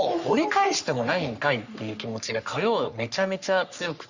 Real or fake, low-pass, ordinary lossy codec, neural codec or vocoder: fake; 7.2 kHz; Opus, 64 kbps; codec, 44.1 kHz, 3.4 kbps, Pupu-Codec